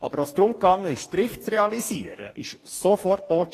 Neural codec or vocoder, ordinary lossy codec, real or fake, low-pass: codec, 44.1 kHz, 2.6 kbps, DAC; AAC, 48 kbps; fake; 14.4 kHz